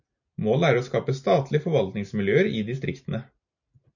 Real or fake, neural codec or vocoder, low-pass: real; none; 7.2 kHz